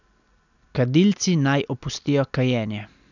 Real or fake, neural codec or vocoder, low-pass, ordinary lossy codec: real; none; 7.2 kHz; none